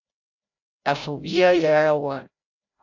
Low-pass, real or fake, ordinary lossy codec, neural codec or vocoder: 7.2 kHz; fake; AAC, 48 kbps; codec, 16 kHz, 0.5 kbps, FreqCodec, larger model